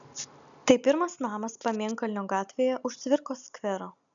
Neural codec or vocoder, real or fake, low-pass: none; real; 7.2 kHz